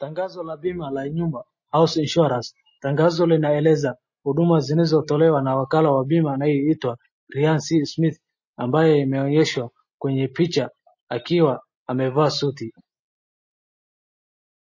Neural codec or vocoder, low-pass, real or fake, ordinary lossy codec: none; 7.2 kHz; real; MP3, 32 kbps